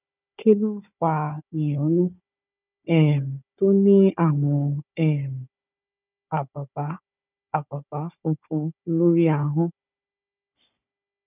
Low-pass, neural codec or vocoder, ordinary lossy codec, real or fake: 3.6 kHz; codec, 16 kHz, 4 kbps, FunCodec, trained on Chinese and English, 50 frames a second; none; fake